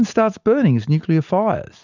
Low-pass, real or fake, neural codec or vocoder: 7.2 kHz; real; none